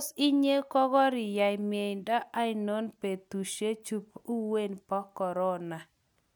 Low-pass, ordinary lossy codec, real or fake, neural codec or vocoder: none; none; real; none